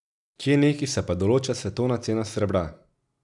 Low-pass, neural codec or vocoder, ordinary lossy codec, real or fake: 10.8 kHz; none; none; real